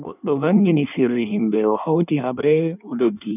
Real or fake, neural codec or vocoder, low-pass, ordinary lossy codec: fake; codec, 16 kHz in and 24 kHz out, 1.1 kbps, FireRedTTS-2 codec; 3.6 kHz; none